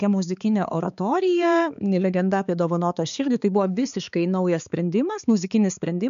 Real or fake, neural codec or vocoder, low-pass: fake; codec, 16 kHz, 4 kbps, X-Codec, HuBERT features, trained on balanced general audio; 7.2 kHz